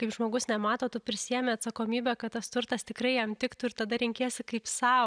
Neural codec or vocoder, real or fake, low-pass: none; real; 9.9 kHz